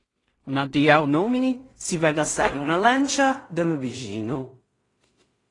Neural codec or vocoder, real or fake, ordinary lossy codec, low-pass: codec, 16 kHz in and 24 kHz out, 0.4 kbps, LongCat-Audio-Codec, two codebook decoder; fake; AAC, 32 kbps; 10.8 kHz